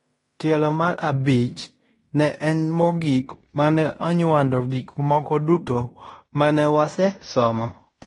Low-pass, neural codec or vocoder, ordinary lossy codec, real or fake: 10.8 kHz; codec, 16 kHz in and 24 kHz out, 0.9 kbps, LongCat-Audio-Codec, fine tuned four codebook decoder; AAC, 32 kbps; fake